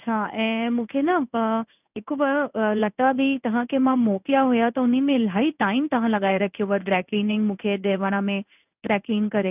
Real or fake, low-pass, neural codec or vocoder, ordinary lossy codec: fake; 3.6 kHz; codec, 16 kHz in and 24 kHz out, 1 kbps, XY-Tokenizer; none